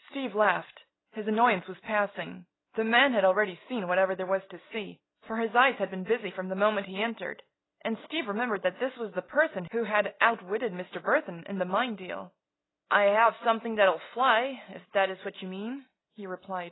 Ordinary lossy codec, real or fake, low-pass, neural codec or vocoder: AAC, 16 kbps; real; 7.2 kHz; none